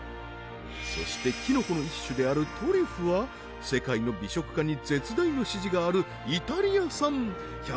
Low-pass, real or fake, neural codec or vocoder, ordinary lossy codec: none; real; none; none